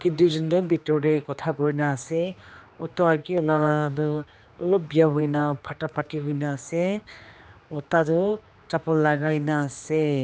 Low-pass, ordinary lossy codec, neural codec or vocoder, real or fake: none; none; codec, 16 kHz, 2 kbps, X-Codec, HuBERT features, trained on general audio; fake